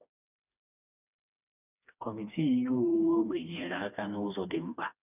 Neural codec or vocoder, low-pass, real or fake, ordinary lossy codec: codec, 16 kHz, 2 kbps, FreqCodec, smaller model; 3.6 kHz; fake; none